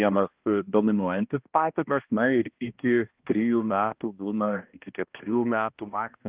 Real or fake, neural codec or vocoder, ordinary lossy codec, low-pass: fake; codec, 16 kHz, 1 kbps, X-Codec, HuBERT features, trained on balanced general audio; Opus, 32 kbps; 3.6 kHz